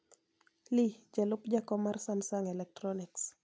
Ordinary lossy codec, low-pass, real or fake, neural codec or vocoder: none; none; real; none